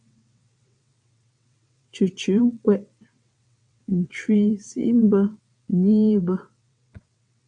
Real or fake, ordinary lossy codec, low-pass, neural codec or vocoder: fake; AAC, 64 kbps; 9.9 kHz; vocoder, 22.05 kHz, 80 mel bands, WaveNeXt